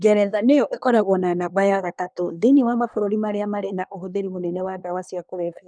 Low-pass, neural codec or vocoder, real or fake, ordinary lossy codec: 9.9 kHz; codec, 24 kHz, 1 kbps, SNAC; fake; none